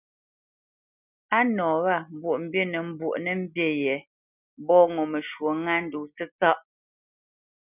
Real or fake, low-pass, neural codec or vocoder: real; 3.6 kHz; none